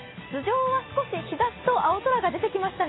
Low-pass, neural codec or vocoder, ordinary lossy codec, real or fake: 7.2 kHz; none; AAC, 16 kbps; real